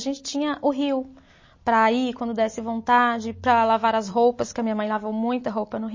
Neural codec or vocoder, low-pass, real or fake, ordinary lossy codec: none; 7.2 kHz; real; MP3, 32 kbps